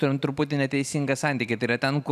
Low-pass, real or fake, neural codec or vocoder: 14.4 kHz; real; none